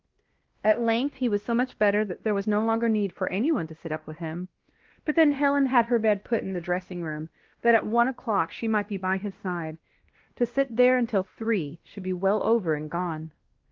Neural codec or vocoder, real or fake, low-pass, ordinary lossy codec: codec, 16 kHz, 1 kbps, X-Codec, WavLM features, trained on Multilingual LibriSpeech; fake; 7.2 kHz; Opus, 16 kbps